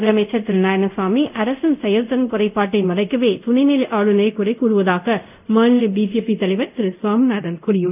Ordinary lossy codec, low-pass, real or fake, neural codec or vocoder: none; 3.6 kHz; fake; codec, 24 kHz, 0.5 kbps, DualCodec